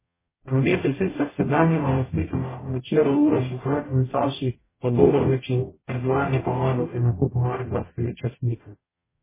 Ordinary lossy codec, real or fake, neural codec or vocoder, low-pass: MP3, 16 kbps; fake; codec, 44.1 kHz, 0.9 kbps, DAC; 3.6 kHz